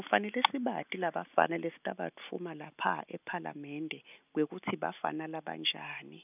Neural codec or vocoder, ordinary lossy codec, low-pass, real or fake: none; none; 3.6 kHz; real